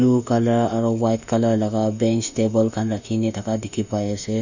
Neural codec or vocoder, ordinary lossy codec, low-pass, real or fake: autoencoder, 48 kHz, 32 numbers a frame, DAC-VAE, trained on Japanese speech; none; 7.2 kHz; fake